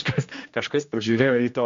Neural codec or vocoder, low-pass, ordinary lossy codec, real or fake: codec, 16 kHz, 1 kbps, X-Codec, HuBERT features, trained on general audio; 7.2 kHz; MP3, 48 kbps; fake